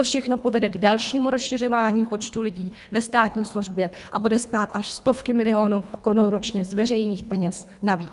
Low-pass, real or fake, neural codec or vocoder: 10.8 kHz; fake; codec, 24 kHz, 1.5 kbps, HILCodec